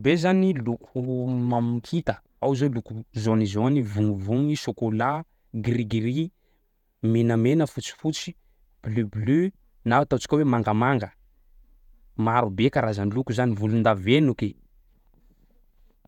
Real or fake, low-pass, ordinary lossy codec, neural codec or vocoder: fake; 19.8 kHz; none; vocoder, 48 kHz, 128 mel bands, Vocos